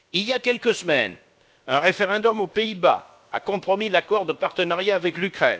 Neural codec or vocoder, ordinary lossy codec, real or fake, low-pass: codec, 16 kHz, about 1 kbps, DyCAST, with the encoder's durations; none; fake; none